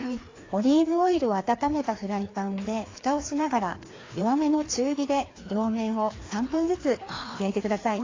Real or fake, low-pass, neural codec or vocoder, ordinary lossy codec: fake; 7.2 kHz; codec, 16 kHz, 2 kbps, FreqCodec, larger model; AAC, 32 kbps